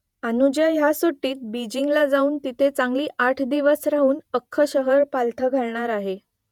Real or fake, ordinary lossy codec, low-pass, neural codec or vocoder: fake; none; 19.8 kHz; vocoder, 48 kHz, 128 mel bands, Vocos